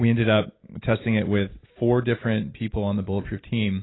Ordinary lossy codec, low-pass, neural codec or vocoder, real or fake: AAC, 16 kbps; 7.2 kHz; none; real